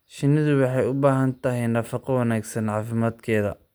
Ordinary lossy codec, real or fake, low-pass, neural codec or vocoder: none; real; none; none